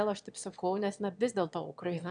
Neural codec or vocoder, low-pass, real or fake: autoencoder, 22.05 kHz, a latent of 192 numbers a frame, VITS, trained on one speaker; 9.9 kHz; fake